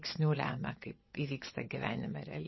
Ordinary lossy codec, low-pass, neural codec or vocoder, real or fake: MP3, 24 kbps; 7.2 kHz; vocoder, 22.05 kHz, 80 mel bands, WaveNeXt; fake